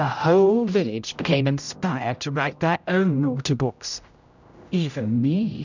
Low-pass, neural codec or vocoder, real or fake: 7.2 kHz; codec, 16 kHz, 0.5 kbps, X-Codec, HuBERT features, trained on general audio; fake